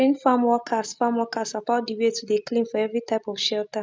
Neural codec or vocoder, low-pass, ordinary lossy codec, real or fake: none; none; none; real